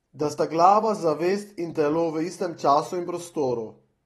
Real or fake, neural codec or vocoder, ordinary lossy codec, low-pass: real; none; AAC, 32 kbps; 19.8 kHz